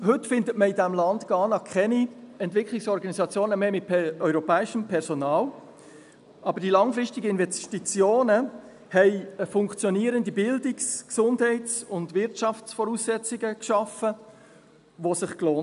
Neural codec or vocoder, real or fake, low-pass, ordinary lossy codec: none; real; 10.8 kHz; none